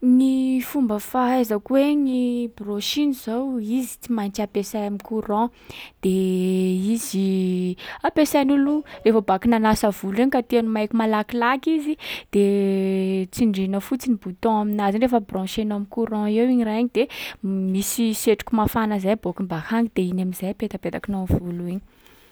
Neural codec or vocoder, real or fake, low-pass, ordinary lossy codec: none; real; none; none